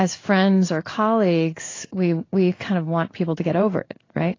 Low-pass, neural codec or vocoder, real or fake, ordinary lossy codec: 7.2 kHz; codec, 16 kHz in and 24 kHz out, 1 kbps, XY-Tokenizer; fake; AAC, 32 kbps